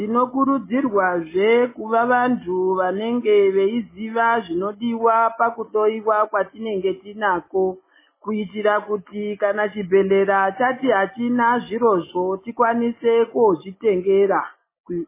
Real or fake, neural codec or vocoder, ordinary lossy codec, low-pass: real; none; MP3, 16 kbps; 3.6 kHz